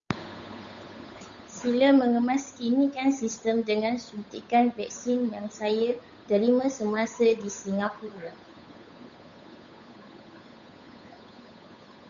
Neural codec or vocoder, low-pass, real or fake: codec, 16 kHz, 8 kbps, FunCodec, trained on Chinese and English, 25 frames a second; 7.2 kHz; fake